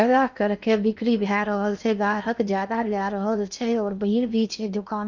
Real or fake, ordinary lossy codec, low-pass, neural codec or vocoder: fake; none; 7.2 kHz; codec, 16 kHz in and 24 kHz out, 0.8 kbps, FocalCodec, streaming, 65536 codes